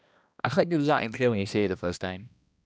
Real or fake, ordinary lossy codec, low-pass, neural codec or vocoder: fake; none; none; codec, 16 kHz, 1 kbps, X-Codec, HuBERT features, trained on balanced general audio